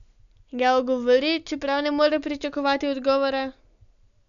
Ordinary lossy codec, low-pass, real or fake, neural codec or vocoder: none; 7.2 kHz; real; none